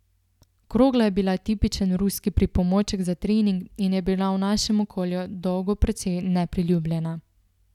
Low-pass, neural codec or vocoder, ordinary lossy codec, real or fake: 19.8 kHz; none; none; real